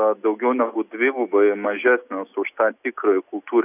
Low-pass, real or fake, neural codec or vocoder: 3.6 kHz; real; none